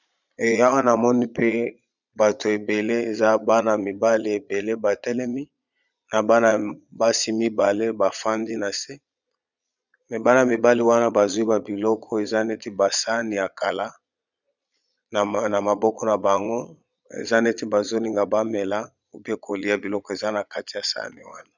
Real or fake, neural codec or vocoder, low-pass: fake; vocoder, 22.05 kHz, 80 mel bands, Vocos; 7.2 kHz